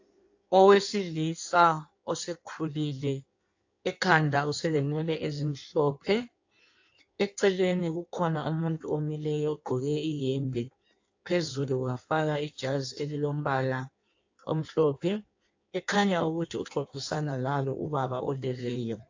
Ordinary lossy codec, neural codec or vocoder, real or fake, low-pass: AAC, 48 kbps; codec, 16 kHz in and 24 kHz out, 1.1 kbps, FireRedTTS-2 codec; fake; 7.2 kHz